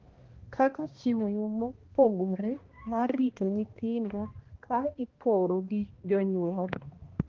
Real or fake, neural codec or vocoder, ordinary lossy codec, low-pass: fake; codec, 16 kHz, 1 kbps, X-Codec, HuBERT features, trained on balanced general audio; Opus, 16 kbps; 7.2 kHz